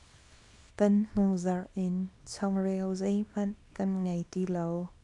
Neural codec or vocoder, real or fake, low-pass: codec, 24 kHz, 0.9 kbps, WavTokenizer, small release; fake; 10.8 kHz